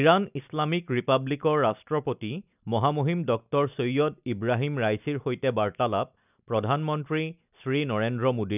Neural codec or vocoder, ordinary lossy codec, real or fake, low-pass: none; none; real; 3.6 kHz